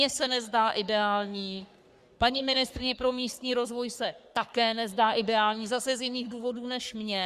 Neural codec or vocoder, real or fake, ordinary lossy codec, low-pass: codec, 44.1 kHz, 3.4 kbps, Pupu-Codec; fake; Opus, 64 kbps; 14.4 kHz